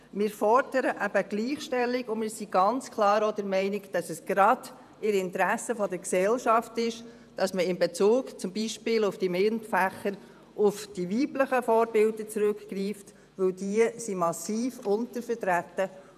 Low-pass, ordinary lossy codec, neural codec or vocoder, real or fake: 14.4 kHz; none; vocoder, 48 kHz, 128 mel bands, Vocos; fake